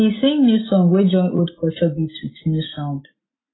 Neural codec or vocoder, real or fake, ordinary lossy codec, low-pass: none; real; AAC, 16 kbps; 7.2 kHz